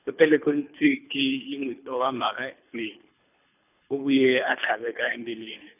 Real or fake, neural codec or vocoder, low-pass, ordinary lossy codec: fake; codec, 24 kHz, 3 kbps, HILCodec; 3.6 kHz; none